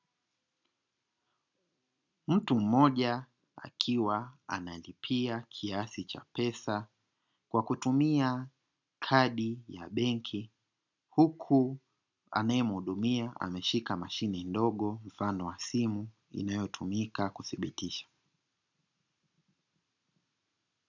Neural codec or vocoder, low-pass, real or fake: none; 7.2 kHz; real